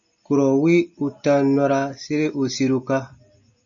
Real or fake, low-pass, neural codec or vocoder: real; 7.2 kHz; none